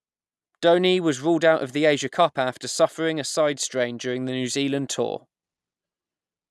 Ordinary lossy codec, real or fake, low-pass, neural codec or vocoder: none; real; none; none